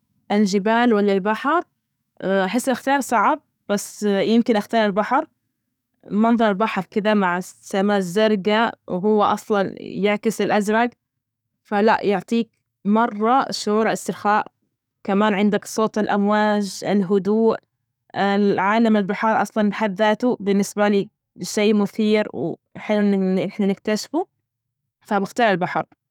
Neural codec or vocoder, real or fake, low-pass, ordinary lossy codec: codec, 44.1 kHz, 7.8 kbps, DAC; fake; 19.8 kHz; none